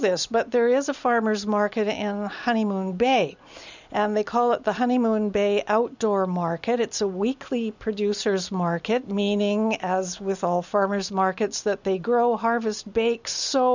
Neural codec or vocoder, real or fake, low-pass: none; real; 7.2 kHz